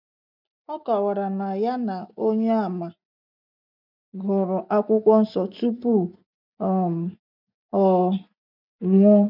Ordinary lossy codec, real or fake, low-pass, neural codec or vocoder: none; real; 5.4 kHz; none